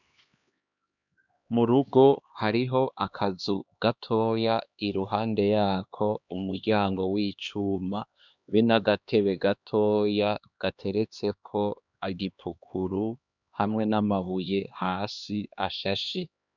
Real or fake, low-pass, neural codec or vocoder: fake; 7.2 kHz; codec, 16 kHz, 2 kbps, X-Codec, HuBERT features, trained on LibriSpeech